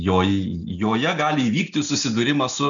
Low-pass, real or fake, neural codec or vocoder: 7.2 kHz; real; none